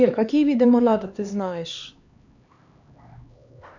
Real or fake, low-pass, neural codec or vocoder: fake; 7.2 kHz; codec, 16 kHz, 2 kbps, X-Codec, HuBERT features, trained on LibriSpeech